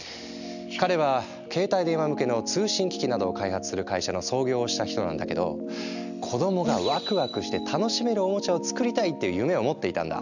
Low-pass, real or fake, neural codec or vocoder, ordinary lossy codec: 7.2 kHz; real; none; none